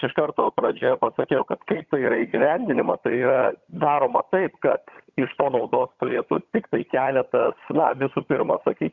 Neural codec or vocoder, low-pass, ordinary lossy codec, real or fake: vocoder, 22.05 kHz, 80 mel bands, HiFi-GAN; 7.2 kHz; Opus, 64 kbps; fake